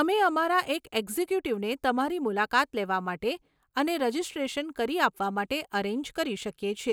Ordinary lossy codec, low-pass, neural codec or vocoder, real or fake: none; none; none; real